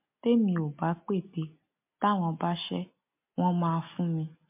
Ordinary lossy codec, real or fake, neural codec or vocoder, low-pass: MP3, 32 kbps; real; none; 3.6 kHz